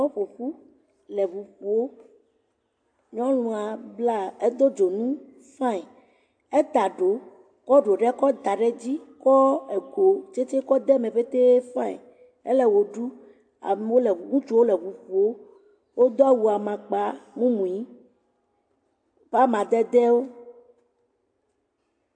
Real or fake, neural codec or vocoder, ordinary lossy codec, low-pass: real; none; AAC, 64 kbps; 9.9 kHz